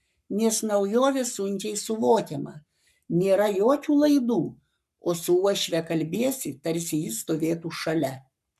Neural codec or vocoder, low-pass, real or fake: codec, 44.1 kHz, 7.8 kbps, Pupu-Codec; 14.4 kHz; fake